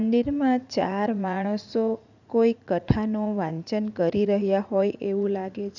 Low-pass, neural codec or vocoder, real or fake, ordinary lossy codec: 7.2 kHz; vocoder, 44.1 kHz, 80 mel bands, Vocos; fake; none